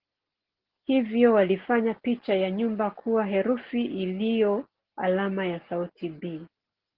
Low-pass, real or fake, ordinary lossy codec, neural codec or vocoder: 5.4 kHz; real; Opus, 16 kbps; none